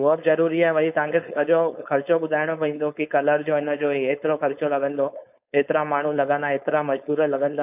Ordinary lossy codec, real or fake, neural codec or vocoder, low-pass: none; fake; codec, 16 kHz, 4.8 kbps, FACodec; 3.6 kHz